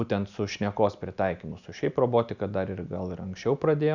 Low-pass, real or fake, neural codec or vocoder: 7.2 kHz; real; none